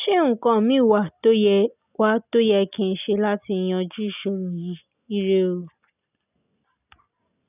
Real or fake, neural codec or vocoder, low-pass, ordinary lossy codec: real; none; 3.6 kHz; none